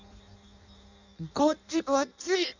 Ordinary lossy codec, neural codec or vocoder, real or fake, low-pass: none; codec, 16 kHz in and 24 kHz out, 0.6 kbps, FireRedTTS-2 codec; fake; 7.2 kHz